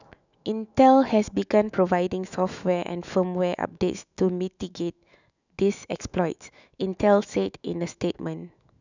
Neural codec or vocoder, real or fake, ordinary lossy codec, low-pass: autoencoder, 48 kHz, 128 numbers a frame, DAC-VAE, trained on Japanese speech; fake; none; 7.2 kHz